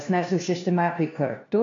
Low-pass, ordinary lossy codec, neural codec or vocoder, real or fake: 7.2 kHz; AAC, 48 kbps; codec, 16 kHz, 1 kbps, FunCodec, trained on LibriTTS, 50 frames a second; fake